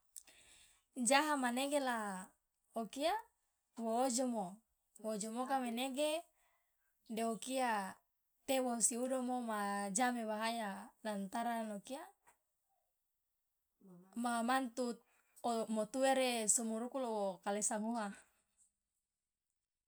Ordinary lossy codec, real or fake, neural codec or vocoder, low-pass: none; real; none; none